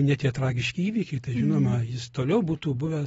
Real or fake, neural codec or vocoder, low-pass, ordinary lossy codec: real; none; 19.8 kHz; AAC, 24 kbps